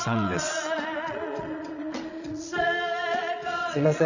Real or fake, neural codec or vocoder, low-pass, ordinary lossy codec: fake; vocoder, 22.05 kHz, 80 mel bands, Vocos; 7.2 kHz; none